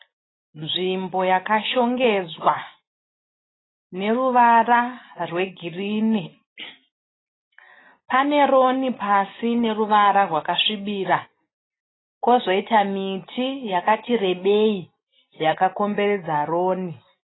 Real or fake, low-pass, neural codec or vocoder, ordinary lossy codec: real; 7.2 kHz; none; AAC, 16 kbps